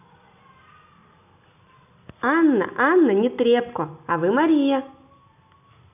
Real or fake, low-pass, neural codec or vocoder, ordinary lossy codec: real; 3.6 kHz; none; none